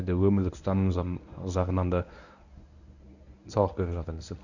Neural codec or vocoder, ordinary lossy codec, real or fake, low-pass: codec, 24 kHz, 0.9 kbps, WavTokenizer, medium speech release version 1; none; fake; 7.2 kHz